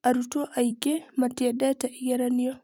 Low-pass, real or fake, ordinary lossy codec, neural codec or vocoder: 19.8 kHz; fake; none; vocoder, 44.1 kHz, 128 mel bands every 256 samples, BigVGAN v2